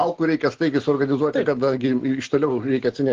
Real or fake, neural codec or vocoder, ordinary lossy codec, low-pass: real; none; Opus, 16 kbps; 7.2 kHz